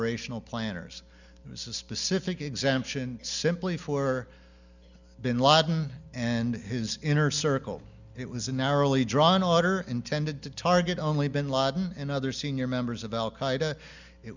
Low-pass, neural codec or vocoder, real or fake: 7.2 kHz; none; real